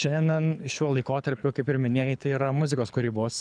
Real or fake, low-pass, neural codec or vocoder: fake; 9.9 kHz; codec, 24 kHz, 6 kbps, HILCodec